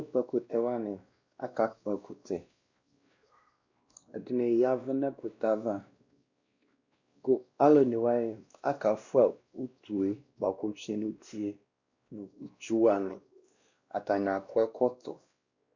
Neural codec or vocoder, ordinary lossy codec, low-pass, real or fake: codec, 16 kHz, 1 kbps, X-Codec, WavLM features, trained on Multilingual LibriSpeech; Opus, 64 kbps; 7.2 kHz; fake